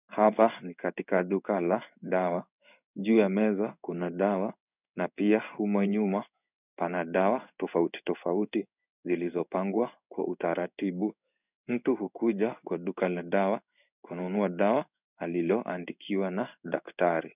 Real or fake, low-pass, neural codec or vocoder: fake; 3.6 kHz; codec, 16 kHz in and 24 kHz out, 1 kbps, XY-Tokenizer